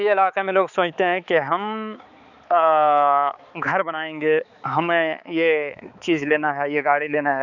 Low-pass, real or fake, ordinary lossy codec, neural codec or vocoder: 7.2 kHz; fake; none; codec, 16 kHz, 4 kbps, X-Codec, HuBERT features, trained on balanced general audio